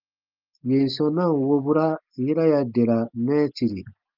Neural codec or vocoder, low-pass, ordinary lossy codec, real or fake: none; 5.4 kHz; Opus, 24 kbps; real